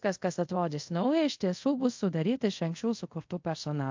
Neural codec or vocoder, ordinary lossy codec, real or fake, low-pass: codec, 24 kHz, 0.5 kbps, DualCodec; MP3, 48 kbps; fake; 7.2 kHz